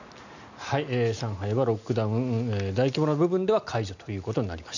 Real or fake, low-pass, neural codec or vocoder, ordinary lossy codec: real; 7.2 kHz; none; none